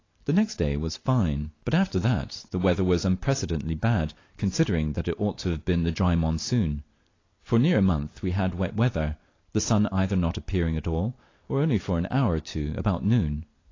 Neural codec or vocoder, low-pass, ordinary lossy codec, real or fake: vocoder, 44.1 kHz, 128 mel bands every 256 samples, BigVGAN v2; 7.2 kHz; AAC, 32 kbps; fake